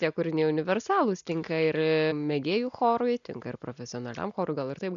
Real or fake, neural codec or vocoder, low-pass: real; none; 7.2 kHz